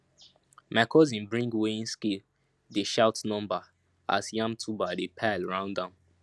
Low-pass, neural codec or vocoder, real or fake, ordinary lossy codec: none; none; real; none